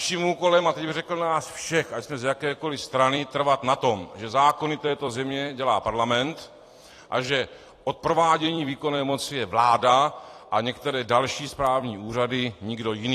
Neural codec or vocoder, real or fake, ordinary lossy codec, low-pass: vocoder, 44.1 kHz, 128 mel bands every 256 samples, BigVGAN v2; fake; AAC, 48 kbps; 14.4 kHz